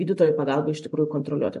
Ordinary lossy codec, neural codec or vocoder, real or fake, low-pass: MP3, 48 kbps; none; real; 14.4 kHz